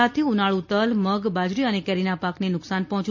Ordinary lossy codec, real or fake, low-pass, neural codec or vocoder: MP3, 32 kbps; real; 7.2 kHz; none